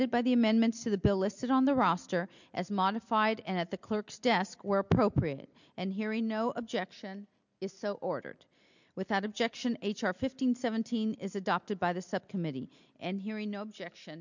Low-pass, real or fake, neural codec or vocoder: 7.2 kHz; real; none